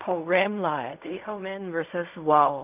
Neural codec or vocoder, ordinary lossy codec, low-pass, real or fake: codec, 16 kHz in and 24 kHz out, 0.4 kbps, LongCat-Audio-Codec, fine tuned four codebook decoder; none; 3.6 kHz; fake